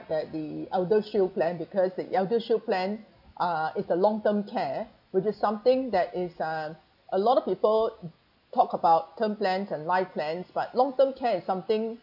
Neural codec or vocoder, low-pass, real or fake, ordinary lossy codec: none; 5.4 kHz; real; none